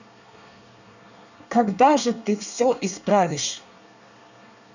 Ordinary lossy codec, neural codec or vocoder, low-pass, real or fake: none; codec, 24 kHz, 1 kbps, SNAC; 7.2 kHz; fake